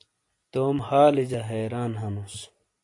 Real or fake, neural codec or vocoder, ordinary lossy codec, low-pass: real; none; AAC, 48 kbps; 10.8 kHz